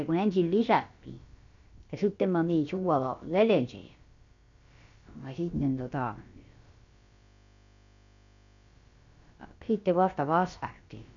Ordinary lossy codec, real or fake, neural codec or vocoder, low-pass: none; fake; codec, 16 kHz, about 1 kbps, DyCAST, with the encoder's durations; 7.2 kHz